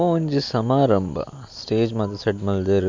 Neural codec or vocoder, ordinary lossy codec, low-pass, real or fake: none; MP3, 64 kbps; 7.2 kHz; real